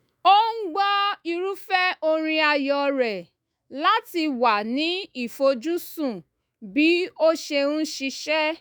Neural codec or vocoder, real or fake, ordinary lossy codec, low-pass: autoencoder, 48 kHz, 128 numbers a frame, DAC-VAE, trained on Japanese speech; fake; none; none